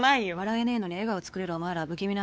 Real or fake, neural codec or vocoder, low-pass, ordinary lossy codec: fake; codec, 16 kHz, 4 kbps, X-Codec, WavLM features, trained on Multilingual LibriSpeech; none; none